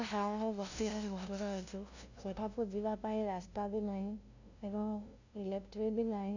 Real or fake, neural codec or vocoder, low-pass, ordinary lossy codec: fake; codec, 16 kHz, 0.5 kbps, FunCodec, trained on LibriTTS, 25 frames a second; 7.2 kHz; none